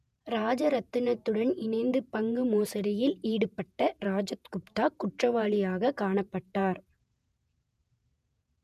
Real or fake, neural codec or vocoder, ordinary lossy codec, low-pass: fake; vocoder, 48 kHz, 128 mel bands, Vocos; none; 14.4 kHz